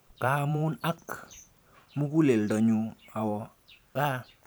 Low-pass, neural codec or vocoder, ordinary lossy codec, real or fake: none; vocoder, 44.1 kHz, 128 mel bands every 512 samples, BigVGAN v2; none; fake